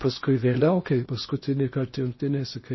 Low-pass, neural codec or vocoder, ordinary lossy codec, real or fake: 7.2 kHz; codec, 16 kHz in and 24 kHz out, 0.8 kbps, FocalCodec, streaming, 65536 codes; MP3, 24 kbps; fake